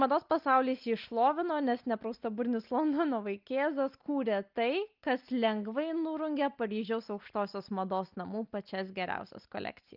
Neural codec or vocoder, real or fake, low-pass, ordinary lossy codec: none; real; 5.4 kHz; Opus, 24 kbps